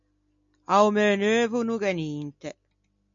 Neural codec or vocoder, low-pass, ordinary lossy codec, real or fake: none; 7.2 kHz; MP3, 64 kbps; real